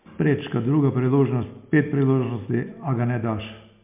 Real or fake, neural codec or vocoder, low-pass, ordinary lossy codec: real; none; 3.6 kHz; MP3, 32 kbps